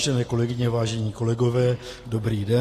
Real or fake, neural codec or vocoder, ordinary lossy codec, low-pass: real; none; AAC, 48 kbps; 14.4 kHz